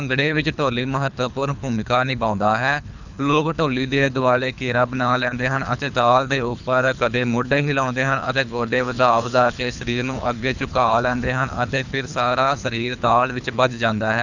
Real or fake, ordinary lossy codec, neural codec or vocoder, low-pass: fake; none; codec, 24 kHz, 3 kbps, HILCodec; 7.2 kHz